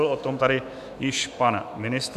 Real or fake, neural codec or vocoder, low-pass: fake; autoencoder, 48 kHz, 128 numbers a frame, DAC-VAE, trained on Japanese speech; 14.4 kHz